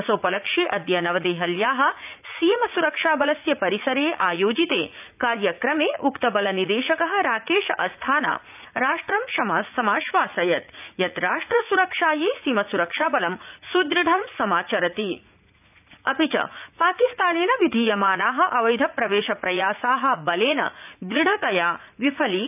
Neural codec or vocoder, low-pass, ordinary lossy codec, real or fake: vocoder, 44.1 kHz, 80 mel bands, Vocos; 3.6 kHz; none; fake